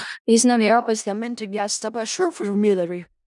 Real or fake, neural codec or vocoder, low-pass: fake; codec, 16 kHz in and 24 kHz out, 0.4 kbps, LongCat-Audio-Codec, four codebook decoder; 10.8 kHz